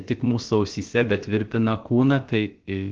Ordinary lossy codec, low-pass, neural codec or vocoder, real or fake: Opus, 16 kbps; 7.2 kHz; codec, 16 kHz, about 1 kbps, DyCAST, with the encoder's durations; fake